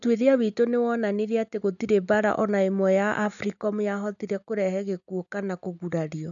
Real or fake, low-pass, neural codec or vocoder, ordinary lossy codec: real; 7.2 kHz; none; MP3, 96 kbps